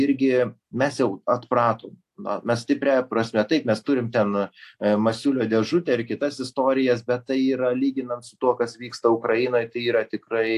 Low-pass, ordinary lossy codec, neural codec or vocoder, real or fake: 14.4 kHz; AAC, 64 kbps; none; real